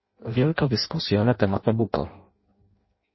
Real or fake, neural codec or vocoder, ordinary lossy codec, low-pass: fake; codec, 16 kHz in and 24 kHz out, 0.6 kbps, FireRedTTS-2 codec; MP3, 24 kbps; 7.2 kHz